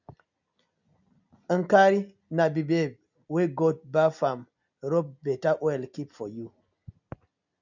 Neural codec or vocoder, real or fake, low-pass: none; real; 7.2 kHz